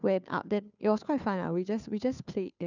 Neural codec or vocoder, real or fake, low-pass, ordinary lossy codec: codec, 16 kHz, 2 kbps, FunCodec, trained on Chinese and English, 25 frames a second; fake; 7.2 kHz; none